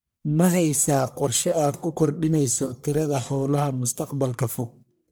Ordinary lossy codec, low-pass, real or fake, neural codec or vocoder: none; none; fake; codec, 44.1 kHz, 1.7 kbps, Pupu-Codec